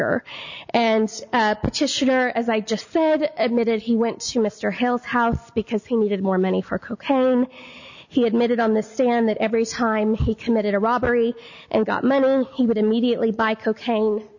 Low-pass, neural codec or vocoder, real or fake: 7.2 kHz; none; real